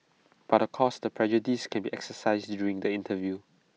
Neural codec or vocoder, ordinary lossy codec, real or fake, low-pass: none; none; real; none